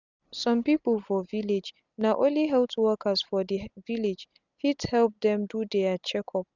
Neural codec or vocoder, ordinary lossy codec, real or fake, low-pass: none; none; real; 7.2 kHz